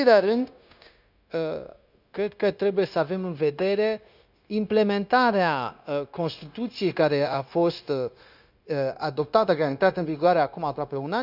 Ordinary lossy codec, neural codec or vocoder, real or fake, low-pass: none; codec, 16 kHz, 0.9 kbps, LongCat-Audio-Codec; fake; 5.4 kHz